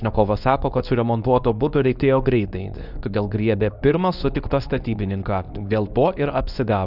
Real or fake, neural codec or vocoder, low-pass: fake; codec, 24 kHz, 0.9 kbps, WavTokenizer, medium speech release version 2; 5.4 kHz